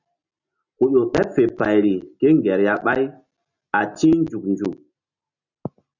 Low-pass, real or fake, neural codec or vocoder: 7.2 kHz; real; none